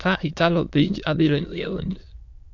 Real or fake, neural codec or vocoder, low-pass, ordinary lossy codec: fake; autoencoder, 22.05 kHz, a latent of 192 numbers a frame, VITS, trained on many speakers; 7.2 kHz; AAC, 48 kbps